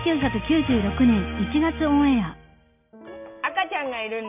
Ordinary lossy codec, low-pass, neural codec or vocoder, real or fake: none; 3.6 kHz; none; real